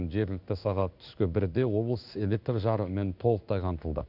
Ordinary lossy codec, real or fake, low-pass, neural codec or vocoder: none; fake; 5.4 kHz; codec, 16 kHz in and 24 kHz out, 1 kbps, XY-Tokenizer